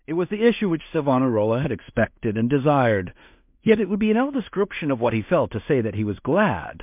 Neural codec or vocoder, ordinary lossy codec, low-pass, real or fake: codec, 16 kHz in and 24 kHz out, 0.4 kbps, LongCat-Audio-Codec, two codebook decoder; MP3, 32 kbps; 3.6 kHz; fake